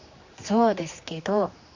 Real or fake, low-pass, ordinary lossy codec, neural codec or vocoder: fake; 7.2 kHz; Opus, 64 kbps; codec, 16 kHz, 2 kbps, X-Codec, HuBERT features, trained on general audio